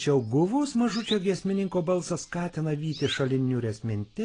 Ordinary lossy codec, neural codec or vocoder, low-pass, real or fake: AAC, 32 kbps; none; 9.9 kHz; real